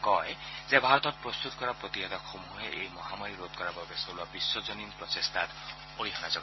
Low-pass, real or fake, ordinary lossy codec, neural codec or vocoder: 7.2 kHz; real; MP3, 24 kbps; none